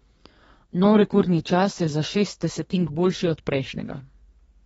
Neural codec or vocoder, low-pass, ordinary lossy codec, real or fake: codec, 32 kHz, 1.9 kbps, SNAC; 14.4 kHz; AAC, 24 kbps; fake